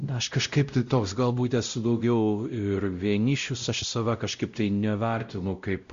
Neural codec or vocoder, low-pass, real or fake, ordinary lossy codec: codec, 16 kHz, 0.5 kbps, X-Codec, WavLM features, trained on Multilingual LibriSpeech; 7.2 kHz; fake; Opus, 64 kbps